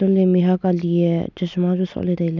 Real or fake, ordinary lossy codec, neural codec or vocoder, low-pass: real; none; none; 7.2 kHz